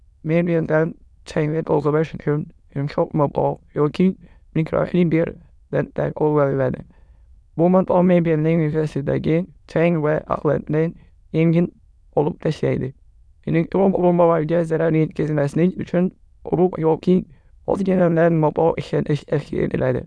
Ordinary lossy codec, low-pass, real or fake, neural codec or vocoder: none; none; fake; autoencoder, 22.05 kHz, a latent of 192 numbers a frame, VITS, trained on many speakers